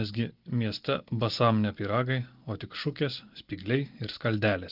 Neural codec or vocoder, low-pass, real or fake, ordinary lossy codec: none; 5.4 kHz; real; Opus, 32 kbps